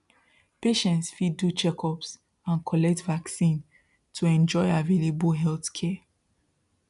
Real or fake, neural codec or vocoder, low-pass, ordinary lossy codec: real; none; 10.8 kHz; none